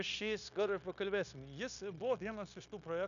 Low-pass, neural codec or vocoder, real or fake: 7.2 kHz; codec, 16 kHz, 0.9 kbps, LongCat-Audio-Codec; fake